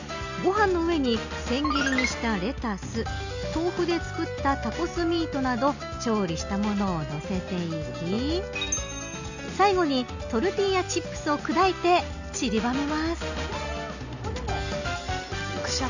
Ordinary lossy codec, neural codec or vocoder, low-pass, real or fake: none; none; 7.2 kHz; real